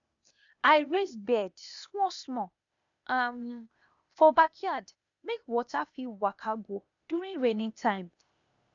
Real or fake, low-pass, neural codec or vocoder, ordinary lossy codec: fake; 7.2 kHz; codec, 16 kHz, 0.8 kbps, ZipCodec; none